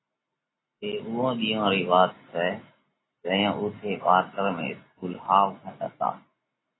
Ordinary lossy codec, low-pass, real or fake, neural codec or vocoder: AAC, 16 kbps; 7.2 kHz; real; none